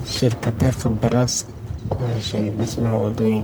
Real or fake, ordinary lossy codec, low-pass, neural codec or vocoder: fake; none; none; codec, 44.1 kHz, 1.7 kbps, Pupu-Codec